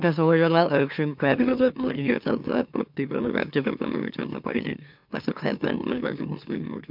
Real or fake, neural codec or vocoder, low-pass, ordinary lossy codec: fake; autoencoder, 44.1 kHz, a latent of 192 numbers a frame, MeloTTS; 5.4 kHz; MP3, 48 kbps